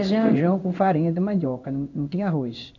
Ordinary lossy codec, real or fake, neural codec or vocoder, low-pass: none; fake; codec, 16 kHz in and 24 kHz out, 1 kbps, XY-Tokenizer; 7.2 kHz